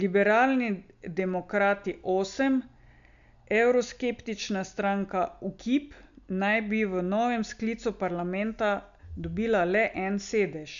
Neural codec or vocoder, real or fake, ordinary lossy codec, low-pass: none; real; AAC, 96 kbps; 7.2 kHz